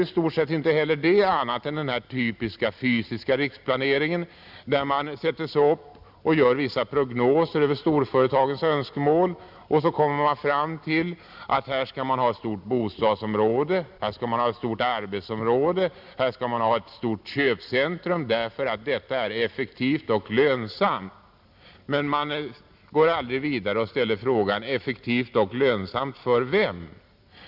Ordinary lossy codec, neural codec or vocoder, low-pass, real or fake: none; none; 5.4 kHz; real